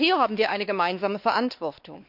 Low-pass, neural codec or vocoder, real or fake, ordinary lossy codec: 5.4 kHz; codec, 16 kHz, 2 kbps, X-Codec, WavLM features, trained on Multilingual LibriSpeech; fake; none